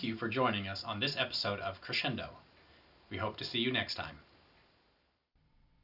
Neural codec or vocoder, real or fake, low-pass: none; real; 5.4 kHz